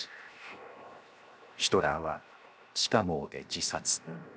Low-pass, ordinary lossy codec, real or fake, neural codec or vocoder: none; none; fake; codec, 16 kHz, 0.7 kbps, FocalCodec